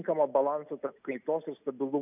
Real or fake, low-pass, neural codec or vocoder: real; 3.6 kHz; none